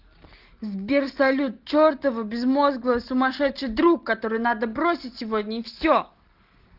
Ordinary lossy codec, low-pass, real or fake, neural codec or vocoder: Opus, 16 kbps; 5.4 kHz; real; none